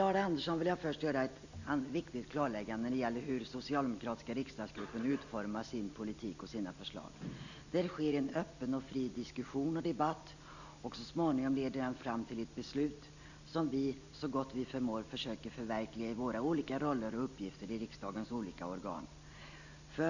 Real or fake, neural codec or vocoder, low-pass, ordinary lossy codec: real; none; 7.2 kHz; none